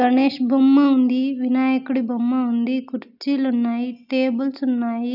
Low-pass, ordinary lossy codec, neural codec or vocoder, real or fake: 5.4 kHz; AAC, 48 kbps; none; real